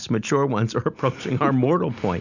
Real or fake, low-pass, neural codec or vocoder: real; 7.2 kHz; none